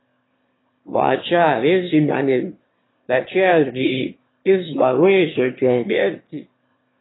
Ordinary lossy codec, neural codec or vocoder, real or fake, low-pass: AAC, 16 kbps; autoencoder, 22.05 kHz, a latent of 192 numbers a frame, VITS, trained on one speaker; fake; 7.2 kHz